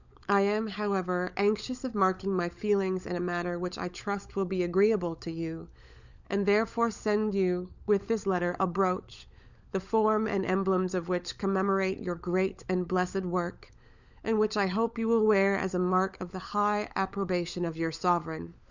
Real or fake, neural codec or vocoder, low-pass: fake; codec, 16 kHz, 16 kbps, FunCodec, trained on LibriTTS, 50 frames a second; 7.2 kHz